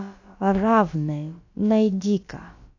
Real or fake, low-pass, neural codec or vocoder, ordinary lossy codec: fake; 7.2 kHz; codec, 16 kHz, about 1 kbps, DyCAST, with the encoder's durations; AAC, 48 kbps